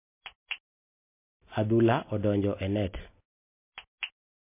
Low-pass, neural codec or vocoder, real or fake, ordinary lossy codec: 3.6 kHz; none; real; MP3, 24 kbps